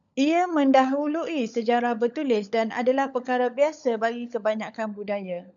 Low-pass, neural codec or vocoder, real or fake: 7.2 kHz; codec, 16 kHz, 16 kbps, FunCodec, trained on LibriTTS, 50 frames a second; fake